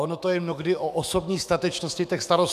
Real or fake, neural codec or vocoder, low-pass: fake; autoencoder, 48 kHz, 128 numbers a frame, DAC-VAE, trained on Japanese speech; 14.4 kHz